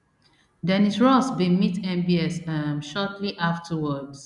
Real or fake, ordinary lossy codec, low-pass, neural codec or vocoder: real; none; 10.8 kHz; none